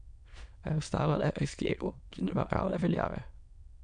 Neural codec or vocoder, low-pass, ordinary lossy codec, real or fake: autoencoder, 22.05 kHz, a latent of 192 numbers a frame, VITS, trained on many speakers; 9.9 kHz; none; fake